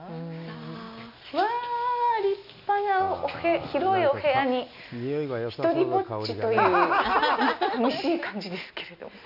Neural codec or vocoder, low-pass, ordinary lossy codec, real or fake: none; 5.4 kHz; none; real